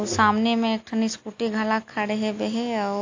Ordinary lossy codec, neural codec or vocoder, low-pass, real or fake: AAC, 48 kbps; none; 7.2 kHz; real